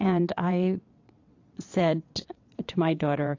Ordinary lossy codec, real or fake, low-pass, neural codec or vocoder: AAC, 48 kbps; fake; 7.2 kHz; vocoder, 22.05 kHz, 80 mel bands, WaveNeXt